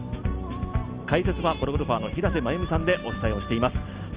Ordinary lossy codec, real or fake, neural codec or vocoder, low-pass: Opus, 32 kbps; real; none; 3.6 kHz